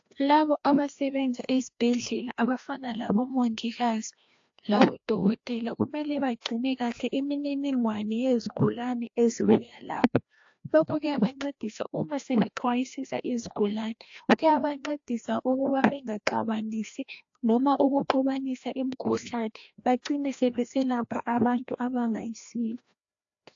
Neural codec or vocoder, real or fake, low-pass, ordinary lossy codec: codec, 16 kHz, 1 kbps, FreqCodec, larger model; fake; 7.2 kHz; AAC, 48 kbps